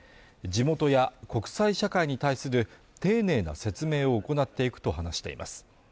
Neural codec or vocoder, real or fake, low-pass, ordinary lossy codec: none; real; none; none